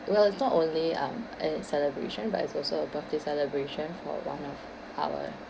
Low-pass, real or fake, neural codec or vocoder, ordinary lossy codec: none; real; none; none